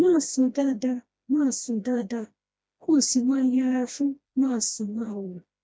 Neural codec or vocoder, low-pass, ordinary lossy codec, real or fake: codec, 16 kHz, 1 kbps, FreqCodec, smaller model; none; none; fake